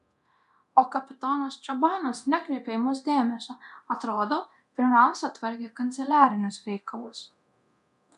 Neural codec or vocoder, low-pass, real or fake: codec, 24 kHz, 0.9 kbps, DualCodec; 10.8 kHz; fake